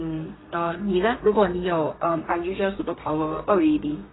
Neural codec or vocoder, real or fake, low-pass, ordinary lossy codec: codec, 32 kHz, 1.9 kbps, SNAC; fake; 7.2 kHz; AAC, 16 kbps